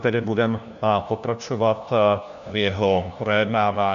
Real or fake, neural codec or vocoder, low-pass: fake; codec, 16 kHz, 1 kbps, FunCodec, trained on LibriTTS, 50 frames a second; 7.2 kHz